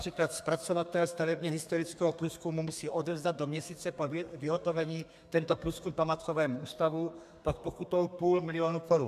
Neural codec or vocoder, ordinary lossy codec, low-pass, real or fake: codec, 32 kHz, 1.9 kbps, SNAC; MP3, 96 kbps; 14.4 kHz; fake